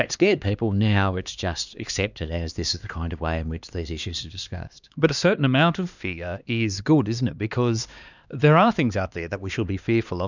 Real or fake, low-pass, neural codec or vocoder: fake; 7.2 kHz; codec, 16 kHz, 2 kbps, X-Codec, HuBERT features, trained on LibriSpeech